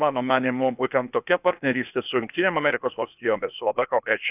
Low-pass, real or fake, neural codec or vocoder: 3.6 kHz; fake; codec, 16 kHz, 0.8 kbps, ZipCodec